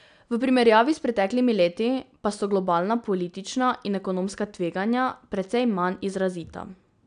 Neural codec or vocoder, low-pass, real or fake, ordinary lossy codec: none; 9.9 kHz; real; none